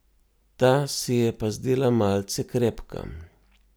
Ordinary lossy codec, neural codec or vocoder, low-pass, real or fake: none; none; none; real